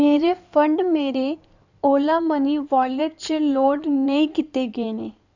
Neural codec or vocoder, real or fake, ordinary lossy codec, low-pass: codec, 16 kHz in and 24 kHz out, 2.2 kbps, FireRedTTS-2 codec; fake; none; 7.2 kHz